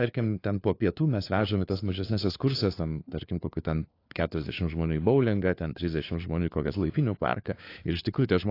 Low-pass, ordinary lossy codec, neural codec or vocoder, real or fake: 5.4 kHz; AAC, 32 kbps; codec, 16 kHz, 4 kbps, FunCodec, trained on LibriTTS, 50 frames a second; fake